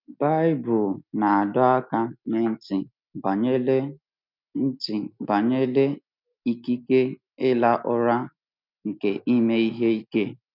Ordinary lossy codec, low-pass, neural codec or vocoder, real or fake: none; 5.4 kHz; none; real